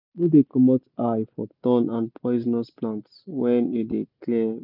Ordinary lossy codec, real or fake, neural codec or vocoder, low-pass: none; real; none; 5.4 kHz